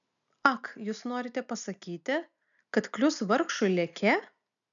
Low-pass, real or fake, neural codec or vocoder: 7.2 kHz; real; none